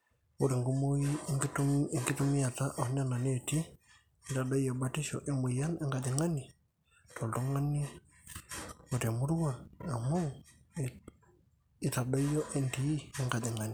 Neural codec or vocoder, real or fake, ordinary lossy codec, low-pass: none; real; none; none